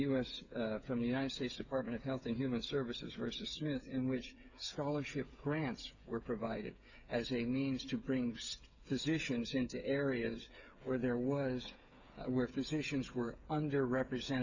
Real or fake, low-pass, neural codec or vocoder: fake; 7.2 kHz; codec, 16 kHz, 8 kbps, FreqCodec, smaller model